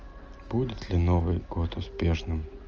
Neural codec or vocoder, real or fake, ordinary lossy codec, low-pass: none; real; Opus, 24 kbps; 7.2 kHz